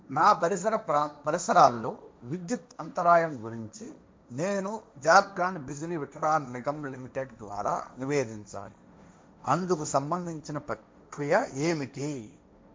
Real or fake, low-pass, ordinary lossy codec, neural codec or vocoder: fake; none; none; codec, 16 kHz, 1.1 kbps, Voila-Tokenizer